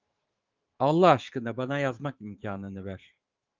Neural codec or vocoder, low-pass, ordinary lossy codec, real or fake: autoencoder, 48 kHz, 128 numbers a frame, DAC-VAE, trained on Japanese speech; 7.2 kHz; Opus, 32 kbps; fake